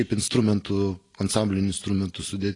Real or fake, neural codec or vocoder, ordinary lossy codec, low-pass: real; none; AAC, 32 kbps; 10.8 kHz